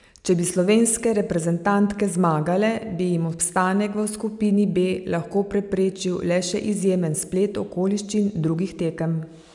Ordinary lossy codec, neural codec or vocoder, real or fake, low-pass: none; none; real; 10.8 kHz